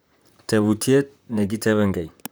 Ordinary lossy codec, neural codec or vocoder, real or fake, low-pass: none; vocoder, 44.1 kHz, 128 mel bands, Pupu-Vocoder; fake; none